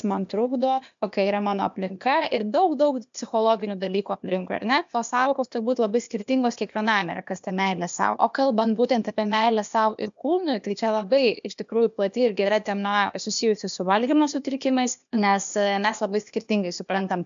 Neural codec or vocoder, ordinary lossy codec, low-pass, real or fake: codec, 16 kHz, 0.8 kbps, ZipCodec; MP3, 64 kbps; 7.2 kHz; fake